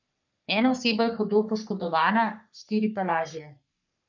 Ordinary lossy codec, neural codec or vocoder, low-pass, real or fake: none; codec, 44.1 kHz, 3.4 kbps, Pupu-Codec; 7.2 kHz; fake